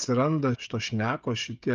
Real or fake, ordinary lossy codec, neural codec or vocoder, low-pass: fake; Opus, 24 kbps; codec, 16 kHz, 16 kbps, FreqCodec, smaller model; 7.2 kHz